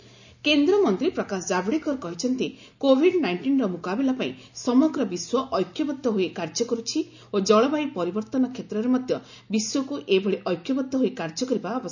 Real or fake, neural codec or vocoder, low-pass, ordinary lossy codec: real; none; 7.2 kHz; none